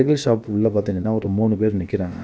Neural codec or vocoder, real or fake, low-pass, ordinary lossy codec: codec, 16 kHz, about 1 kbps, DyCAST, with the encoder's durations; fake; none; none